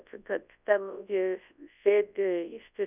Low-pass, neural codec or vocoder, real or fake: 3.6 kHz; codec, 24 kHz, 0.9 kbps, WavTokenizer, large speech release; fake